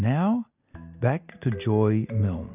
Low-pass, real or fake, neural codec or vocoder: 3.6 kHz; real; none